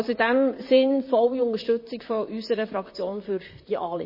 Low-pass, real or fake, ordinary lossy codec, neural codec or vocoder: 5.4 kHz; fake; MP3, 24 kbps; vocoder, 44.1 kHz, 128 mel bands, Pupu-Vocoder